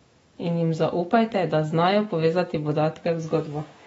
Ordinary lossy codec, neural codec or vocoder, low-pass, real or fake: AAC, 24 kbps; autoencoder, 48 kHz, 128 numbers a frame, DAC-VAE, trained on Japanese speech; 19.8 kHz; fake